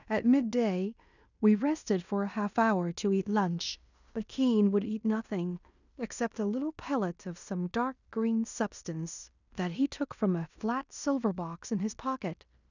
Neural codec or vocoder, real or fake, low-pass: codec, 16 kHz in and 24 kHz out, 0.9 kbps, LongCat-Audio-Codec, fine tuned four codebook decoder; fake; 7.2 kHz